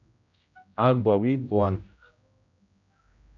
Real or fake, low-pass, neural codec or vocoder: fake; 7.2 kHz; codec, 16 kHz, 0.5 kbps, X-Codec, HuBERT features, trained on general audio